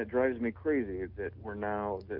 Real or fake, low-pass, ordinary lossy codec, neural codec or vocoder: real; 5.4 kHz; Opus, 24 kbps; none